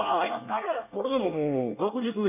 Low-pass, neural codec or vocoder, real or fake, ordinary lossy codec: 3.6 kHz; codec, 24 kHz, 1 kbps, SNAC; fake; none